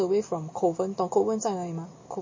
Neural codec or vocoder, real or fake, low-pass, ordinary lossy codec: none; real; 7.2 kHz; MP3, 32 kbps